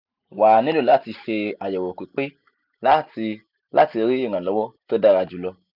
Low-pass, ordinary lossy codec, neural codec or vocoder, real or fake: 5.4 kHz; none; none; real